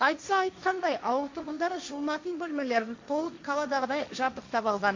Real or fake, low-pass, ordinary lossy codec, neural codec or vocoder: fake; 7.2 kHz; MP3, 48 kbps; codec, 16 kHz, 1.1 kbps, Voila-Tokenizer